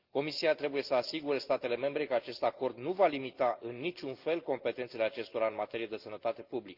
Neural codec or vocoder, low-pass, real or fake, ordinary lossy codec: none; 5.4 kHz; real; Opus, 32 kbps